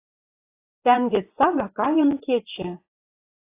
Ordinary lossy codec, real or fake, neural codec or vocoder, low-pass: AAC, 24 kbps; fake; vocoder, 24 kHz, 100 mel bands, Vocos; 3.6 kHz